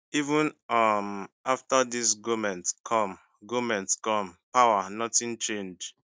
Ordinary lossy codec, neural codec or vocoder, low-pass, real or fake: none; none; none; real